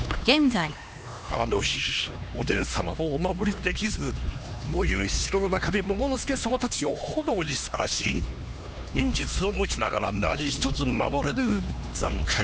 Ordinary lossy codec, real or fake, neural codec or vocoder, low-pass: none; fake; codec, 16 kHz, 2 kbps, X-Codec, HuBERT features, trained on LibriSpeech; none